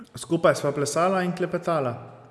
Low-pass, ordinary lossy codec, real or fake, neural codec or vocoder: none; none; real; none